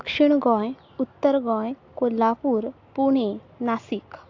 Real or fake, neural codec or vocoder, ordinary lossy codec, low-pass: real; none; AAC, 48 kbps; 7.2 kHz